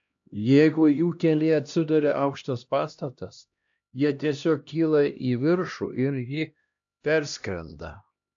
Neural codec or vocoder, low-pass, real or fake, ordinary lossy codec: codec, 16 kHz, 1 kbps, X-Codec, HuBERT features, trained on LibriSpeech; 7.2 kHz; fake; AAC, 64 kbps